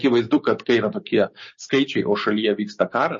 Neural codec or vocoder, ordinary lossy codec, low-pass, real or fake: codec, 16 kHz, 6 kbps, DAC; MP3, 32 kbps; 7.2 kHz; fake